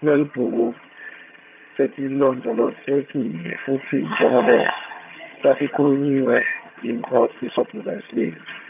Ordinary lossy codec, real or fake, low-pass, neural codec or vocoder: none; fake; 3.6 kHz; vocoder, 22.05 kHz, 80 mel bands, HiFi-GAN